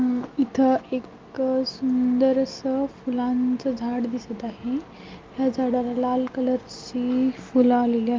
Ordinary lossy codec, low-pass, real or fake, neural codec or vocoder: Opus, 24 kbps; 7.2 kHz; real; none